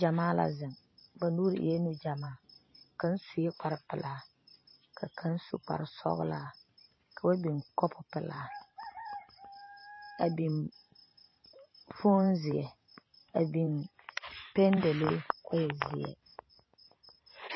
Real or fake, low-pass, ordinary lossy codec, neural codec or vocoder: fake; 7.2 kHz; MP3, 24 kbps; vocoder, 44.1 kHz, 128 mel bands every 512 samples, BigVGAN v2